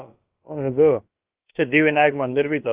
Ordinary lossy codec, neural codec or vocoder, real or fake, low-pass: Opus, 32 kbps; codec, 16 kHz, about 1 kbps, DyCAST, with the encoder's durations; fake; 3.6 kHz